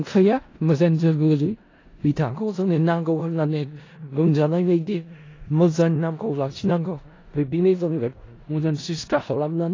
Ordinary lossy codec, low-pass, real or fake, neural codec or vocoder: AAC, 32 kbps; 7.2 kHz; fake; codec, 16 kHz in and 24 kHz out, 0.4 kbps, LongCat-Audio-Codec, four codebook decoder